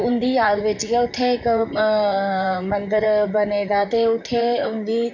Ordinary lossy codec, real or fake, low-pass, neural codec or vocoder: none; fake; 7.2 kHz; vocoder, 44.1 kHz, 128 mel bands, Pupu-Vocoder